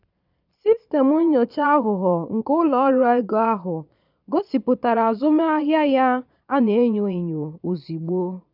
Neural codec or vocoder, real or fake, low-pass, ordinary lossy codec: vocoder, 22.05 kHz, 80 mel bands, Vocos; fake; 5.4 kHz; none